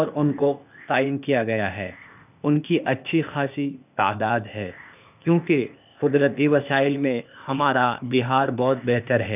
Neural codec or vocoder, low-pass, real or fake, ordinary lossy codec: codec, 16 kHz, 0.8 kbps, ZipCodec; 3.6 kHz; fake; none